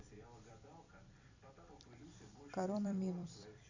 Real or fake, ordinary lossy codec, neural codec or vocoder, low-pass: fake; Opus, 64 kbps; vocoder, 44.1 kHz, 128 mel bands every 256 samples, BigVGAN v2; 7.2 kHz